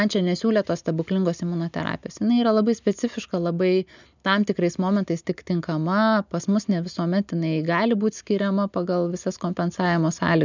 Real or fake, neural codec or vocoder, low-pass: real; none; 7.2 kHz